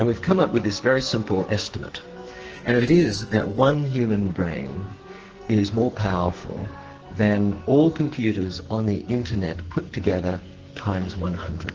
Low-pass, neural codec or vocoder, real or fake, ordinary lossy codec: 7.2 kHz; codec, 44.1 kHz, 2.6 kbps, SNAC; fake; Opus, 16 kbps